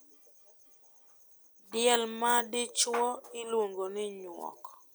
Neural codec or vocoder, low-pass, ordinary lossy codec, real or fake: none; none; none; real